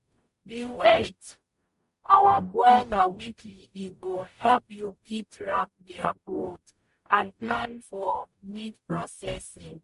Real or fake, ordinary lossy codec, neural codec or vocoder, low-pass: fake; MP3, 48 kbps; codec, 44.1 kHz, 0.9 kbps, DAC; 14.4 kHz